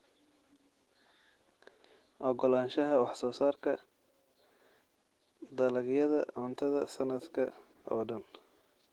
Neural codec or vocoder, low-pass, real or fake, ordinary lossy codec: autoencoder, 48 kHz, 128 numbers a frame, DAC-VAE, trained on Japanese speech; 19.8 kHz; fake; Opus, 16 kbps